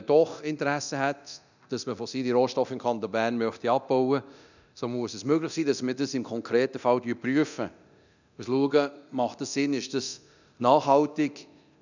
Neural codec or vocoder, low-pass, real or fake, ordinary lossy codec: codec, 24 kHz, 0.9 kbps, DualCodec; 7.2 kHz; fake; none